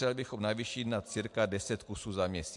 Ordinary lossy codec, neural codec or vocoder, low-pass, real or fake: MP3, 64 kbps; none; 10.8 kHz; real